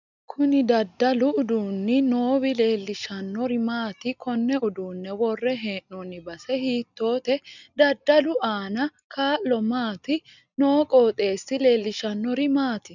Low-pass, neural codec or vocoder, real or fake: 7.2 kHz; none; real